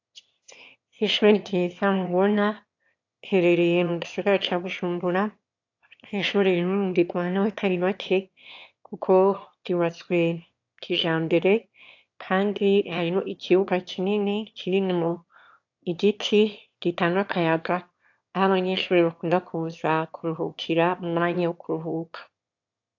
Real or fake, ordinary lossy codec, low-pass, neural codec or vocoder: fake; AAC, 48 kbps; 7.2 kHz; autoencoder, 22.05 kHz, a latent of 192 numbers a frame, VITS, trained on one speaker